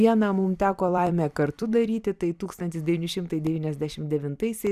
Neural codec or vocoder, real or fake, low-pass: vocoder, 44.1 kHz, 128 mel bands every 256 samples, BigVGAN v2; fake; 14.4 kHz